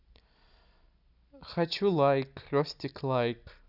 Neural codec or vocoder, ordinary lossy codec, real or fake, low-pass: none; none; real; 5.4 kHz